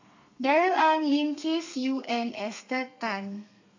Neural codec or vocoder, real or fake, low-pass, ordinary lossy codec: codec, 32 kHz, 1.9 kbps, SNAC; fake; 7.2 kHz; AAC, 48 kbps